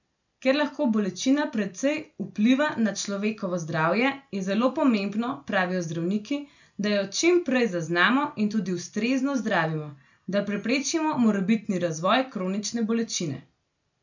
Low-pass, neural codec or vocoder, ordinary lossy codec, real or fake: 7.2 kHz; none; none; real